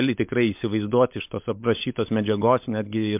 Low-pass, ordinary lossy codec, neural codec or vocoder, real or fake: 3.6 kHz; MP3, 32 kbps; codec, 16 kHz, 16 kbps, FunCodec, trained on LibriTTS, 50 frames a second; fake